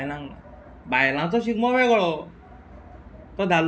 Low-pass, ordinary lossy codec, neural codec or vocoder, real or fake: none; none; none; real